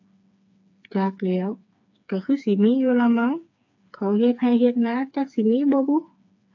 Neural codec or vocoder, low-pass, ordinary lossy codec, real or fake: codec, 16 kHz, 4 kbps, FreqCodec, smaller model; 7.2 kHz; AAC, 48 kbps; fake